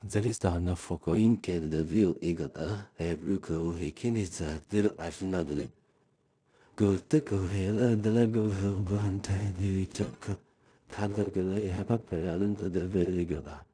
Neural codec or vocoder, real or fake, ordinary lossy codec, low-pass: codec, 16 kHz in and 24 kHz out, 0.4 kbps, LongCat-Audio-Codec, two codebook decoder; fake; none; 9.9 kHz